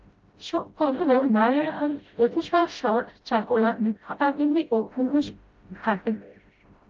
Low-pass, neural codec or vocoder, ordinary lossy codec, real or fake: 7.2 kHz; codec, 16 kHz, 0.5 kbps, FreqCodec, smaller model; Opus, 24 kbps; fake